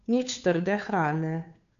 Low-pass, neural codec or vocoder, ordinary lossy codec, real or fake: 7.2 kHz; codec, 16 kHz, 2 kbps, FunCodec, trained on Chinese and English, 25 frames a second; none; fake